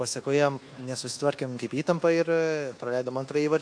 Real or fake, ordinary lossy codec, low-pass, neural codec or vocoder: fake; AAC, 48 kbps; 9.9 kHz; codec, 24 kHz, 1.2 kbps, DualCodec